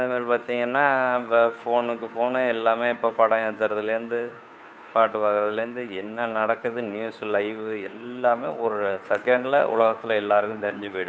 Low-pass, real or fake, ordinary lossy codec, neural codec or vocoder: none; fake; none; codec, 16 kHz, 2 kbps, FunCodec, trained on Chinese and English, 25 frames a second